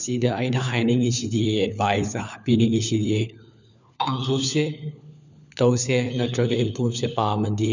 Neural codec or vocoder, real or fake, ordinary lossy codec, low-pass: codec, 16 kHz, 4 kbps, FunCodec, trained on LibriTTS, 50 frames a second; fake; none; 7.2 kHz